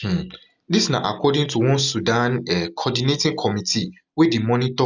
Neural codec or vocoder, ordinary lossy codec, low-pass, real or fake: none; none; 7.2 kHz; real